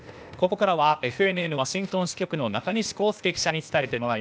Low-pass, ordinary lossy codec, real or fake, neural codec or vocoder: none; none; fake; codec, 16 kHz, 0.8 kbps, ZipCodec